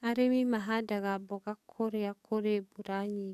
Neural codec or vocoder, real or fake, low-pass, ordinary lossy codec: codec, 44.1 kHz, 7.8 kbps, DAC; fake; 14.4 kHz; none